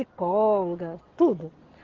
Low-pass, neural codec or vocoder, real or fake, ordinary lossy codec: 7.2 kHz; none; real; Opus, 16 kbps